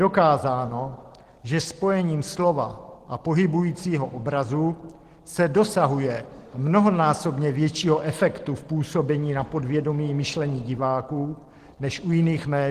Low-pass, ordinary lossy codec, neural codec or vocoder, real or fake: 14.4 kHz; Opus, 16 kbps; none; real